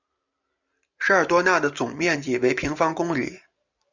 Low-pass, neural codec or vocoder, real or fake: 7.2 kHz; none; real